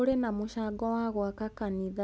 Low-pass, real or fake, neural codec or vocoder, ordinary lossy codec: none; real; none; none